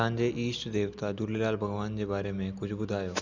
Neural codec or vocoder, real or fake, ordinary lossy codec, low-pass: none; real; none; 7.2 kHz